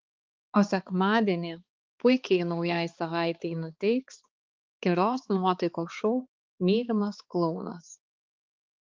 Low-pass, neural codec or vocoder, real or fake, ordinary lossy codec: 7.2 kHz; codec, 16 kHz, 4 kbps, X-Codec, HuBERT features, trained on balanced general audio; fake; Opus, 24 kbps